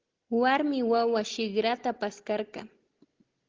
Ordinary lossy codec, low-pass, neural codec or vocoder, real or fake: Opus, 16 kbps; 7.2 kHz; none; real